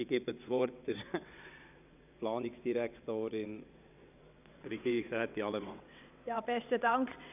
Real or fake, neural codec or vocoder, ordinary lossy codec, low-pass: fake; vocoder, 22.05 kHz, 80 mel bands, WaveNeXt; none; 3.6 kHz